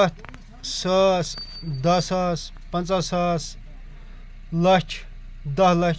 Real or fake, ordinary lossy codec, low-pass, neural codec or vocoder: real; none; none; none